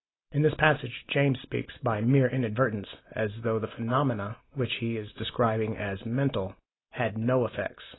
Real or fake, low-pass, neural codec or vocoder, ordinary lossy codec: real; 7.2 kHz; none; AAC, 16 kbps